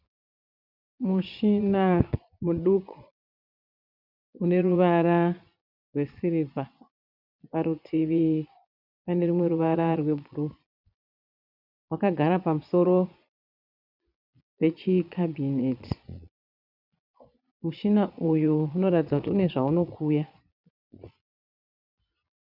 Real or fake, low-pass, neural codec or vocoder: fake; 5.4 kHz; vocoder, 22.05 kHz, 80 mel bands, Vocos